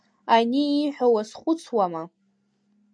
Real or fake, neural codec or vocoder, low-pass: real; none; 9.9 kHz